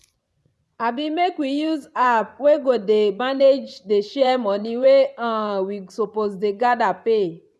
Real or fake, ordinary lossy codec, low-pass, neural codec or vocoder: fake; none; none; vocoder, 24 kHz, 100 mel bands, Vocos